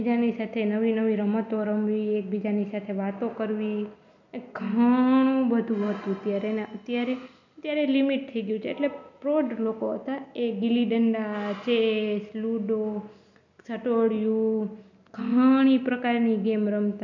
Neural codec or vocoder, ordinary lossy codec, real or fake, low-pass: none; none; real; 7.2 kHz